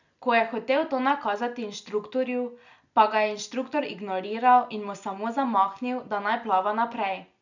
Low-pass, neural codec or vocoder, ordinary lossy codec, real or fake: 7.2 kHz; none; none; real